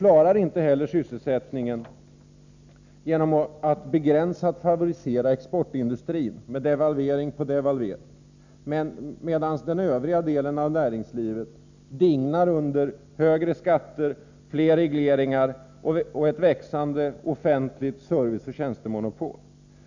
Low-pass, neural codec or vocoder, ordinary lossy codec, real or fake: 7.2 kHz; none; none; real